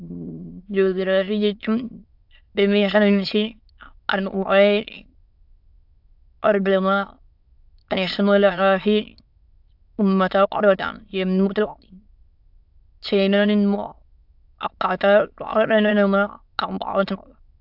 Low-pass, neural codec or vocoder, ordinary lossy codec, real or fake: 5.4 kHz; autoencoder, 22.05 kHz, a latent of 192 numbers a frame, VITS, trained on many speakers; AAC, 48 kbps; fake